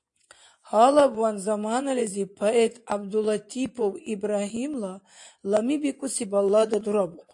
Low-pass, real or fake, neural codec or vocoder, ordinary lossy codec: 10.8 kHz; fake; vocoder, 24 kHz, 100 mel bands, Vocos; AAC, 64 kbps